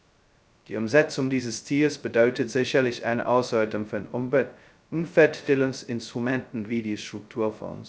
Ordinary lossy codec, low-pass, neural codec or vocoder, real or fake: none; none; codec, 16 kHz, 0.2 kbps, FocalCodec; fake